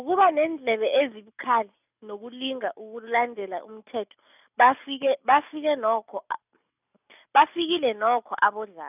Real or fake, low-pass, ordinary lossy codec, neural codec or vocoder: real; 3.6 kHz; none; none